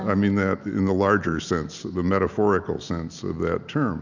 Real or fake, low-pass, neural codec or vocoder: real; 7.2 kHz; none